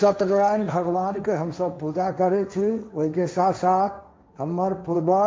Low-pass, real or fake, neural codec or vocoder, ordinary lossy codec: none; fake; codec, 16 kHz, 1.1 kbps, Voila-Tokenizer; none